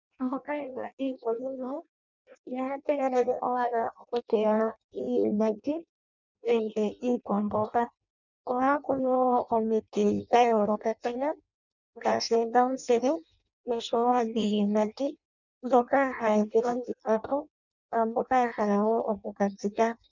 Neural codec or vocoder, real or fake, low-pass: codec, 16 kHz in and 24 kHz out, 0.6 kbps, FireRedTTS-2 codec; fake; 7.2 kHz